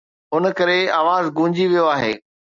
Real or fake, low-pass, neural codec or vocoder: real; 7.2 kHz; none